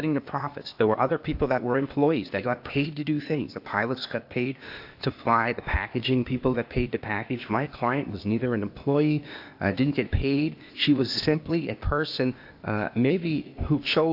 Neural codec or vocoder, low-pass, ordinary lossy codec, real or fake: codec, 16 kHz, 0.8 kbps, ZipCodec; 5.4 kHz; AAC, 48 kbps; fake